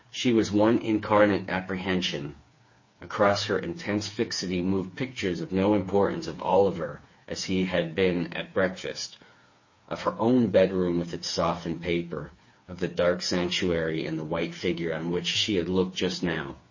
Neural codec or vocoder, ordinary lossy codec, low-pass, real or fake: codec, 16 kHz, 4 kbps, FreqCodec, smaller model; MP3, 32 kbps; 7.2 kHz; fake